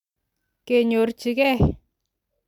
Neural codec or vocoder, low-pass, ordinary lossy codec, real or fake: none; 19.8 kHz; none; real